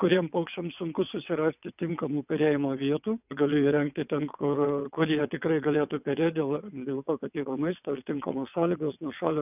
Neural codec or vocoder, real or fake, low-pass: vocoder, 22.05 kHz, 80 mel bands, WaveNeXt; fake; 3.6 kHz